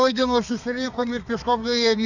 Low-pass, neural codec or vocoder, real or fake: 7.2 kHz; codec, 32 kHz, 1.9 kbps, SNAC; fake